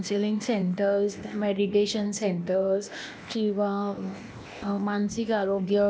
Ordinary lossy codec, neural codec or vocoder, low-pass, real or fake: none; codec, 16 kHz, 0.8 kbps, ZipCodec; none; fake